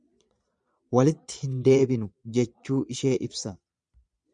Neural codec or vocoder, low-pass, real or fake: vocoder, 22.05 kHz, 80 mel bands, Vocos; 9.9 kHz; fake